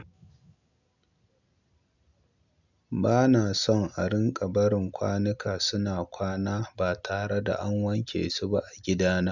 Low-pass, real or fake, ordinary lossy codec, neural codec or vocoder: 7.2 kHz; real; none; none